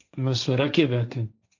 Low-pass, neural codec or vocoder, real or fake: 7.2 kHz; codec, 16 kHz, 1.1 kbps, Voila-Tokenizer; fake